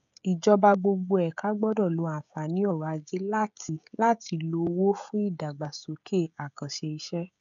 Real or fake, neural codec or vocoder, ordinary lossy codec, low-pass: fake; codec, 16 kHz, 16 kbps, FreqCodec, smaller model; none; 7.2 kHz